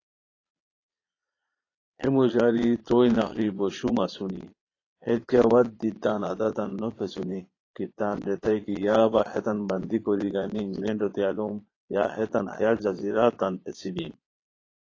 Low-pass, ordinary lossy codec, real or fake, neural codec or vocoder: 7.2 kHz; AAC, 32 kbps; fake; vocoder, 22.05 kHz, 80 mel bands, Vocos